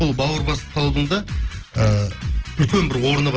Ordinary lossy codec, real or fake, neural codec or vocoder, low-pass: Opus, 16 kbps; real; none; 7.2 kHz